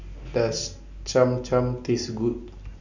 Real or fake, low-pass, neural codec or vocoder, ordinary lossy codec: real; 7.2 kHz; none; none